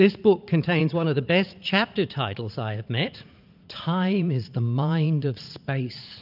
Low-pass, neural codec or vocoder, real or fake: 5.4 kHz; vocoder, 44.1 kHz, 80 mel bands, Vocos; fake